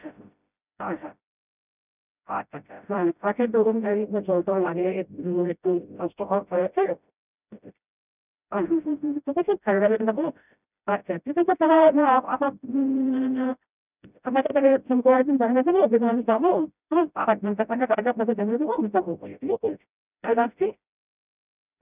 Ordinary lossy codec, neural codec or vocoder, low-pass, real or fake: none; codec, 16 kHz, 0.5 kbps, FreqCodec, smaller model; 3.6 kHz; fake